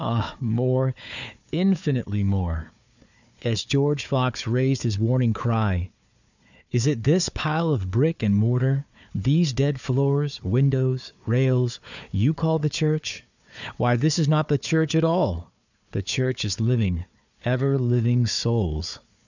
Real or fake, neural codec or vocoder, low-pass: fake; codec, 16 kHz, 4 kbps, FunCodec, trained on Chinese and English, 50 frames a second; 7.2 kHz